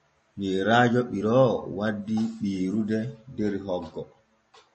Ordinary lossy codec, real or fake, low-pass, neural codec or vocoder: MP3, 32 kbps; real; 10.8 kHz; none